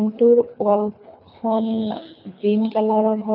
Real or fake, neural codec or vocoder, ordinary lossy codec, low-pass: fake; codec, 24 kHz, 3 kbps, HILCodec; none; 5.4 kHz